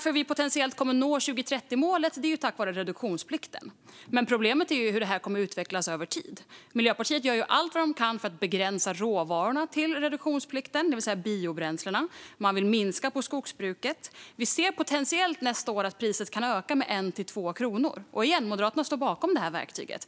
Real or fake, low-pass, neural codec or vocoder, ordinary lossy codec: real; none; none; none